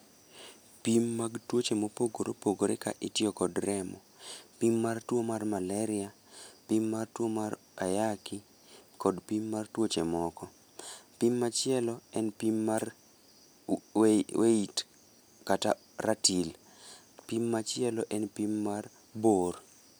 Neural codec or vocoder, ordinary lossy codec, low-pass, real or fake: none; none; none; real